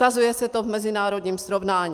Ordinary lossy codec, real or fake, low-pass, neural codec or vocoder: Opus, 32 kbps; real; 14.4 kHz; none